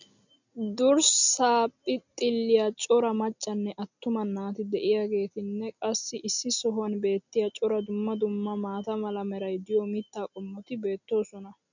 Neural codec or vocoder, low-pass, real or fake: none; 7.2 kHz; real